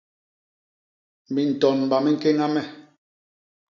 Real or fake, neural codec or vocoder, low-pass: real; none; 7.2 kHz